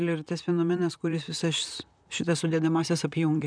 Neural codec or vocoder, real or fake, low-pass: vocoder, 44.1 kHz, 128 mel bands, Pupu-Vocoder; fake; 9.9 kHz